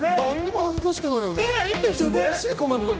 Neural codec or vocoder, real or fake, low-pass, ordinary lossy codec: codec, 16 kHz, 1 kbps, X-Codec, HuBERT features, trained on general audio; fake; none; none